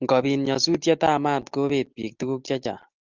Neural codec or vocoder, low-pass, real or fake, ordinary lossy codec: none; 7.2 kHz; real; Opus, 32 kbps